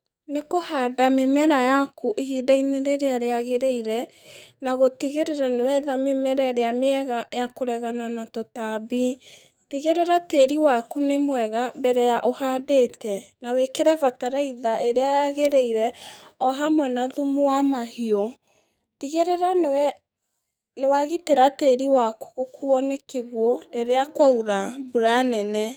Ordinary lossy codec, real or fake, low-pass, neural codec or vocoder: none; fake; none; codec, 44.1 kHz, 2.6 kbps, SNAC